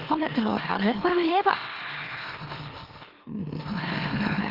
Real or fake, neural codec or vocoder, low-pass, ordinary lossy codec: fake; autoencoder, 44.1 kHz, a latent of 192 numbers a frame, MeloTTS; 5.4 kHz; Opus, 16 kbps